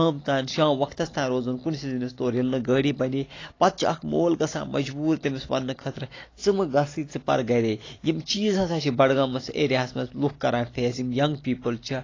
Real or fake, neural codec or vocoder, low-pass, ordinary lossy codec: real; none; 7.2 kHz; AAC, 32 kbps